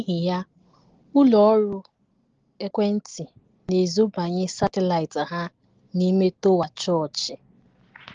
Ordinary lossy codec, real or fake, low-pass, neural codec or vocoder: Opus, 16 kbps; real; 7.2 kHz; none